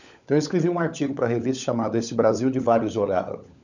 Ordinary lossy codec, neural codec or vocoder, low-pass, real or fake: none; codec, 16 kHz, 16 kbps, FunCodec, trained on LibriTTS, 50 frames a second; 7.2 kHz; fake